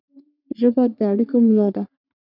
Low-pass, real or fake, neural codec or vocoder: 5.4 kHz; fake; codec, 44.1 kHz, 3.4 kbps, Pupu-Codec